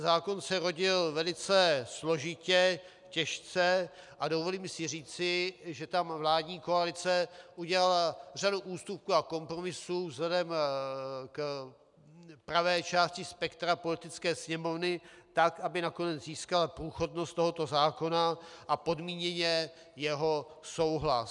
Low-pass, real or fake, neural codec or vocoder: 10.8 kHz; real; none